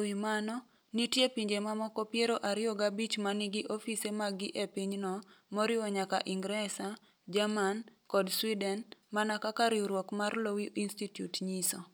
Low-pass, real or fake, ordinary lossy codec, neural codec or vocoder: none; real; none; none